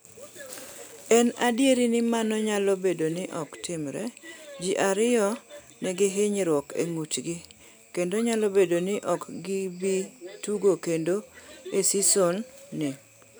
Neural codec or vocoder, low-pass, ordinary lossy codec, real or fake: none; none; none; real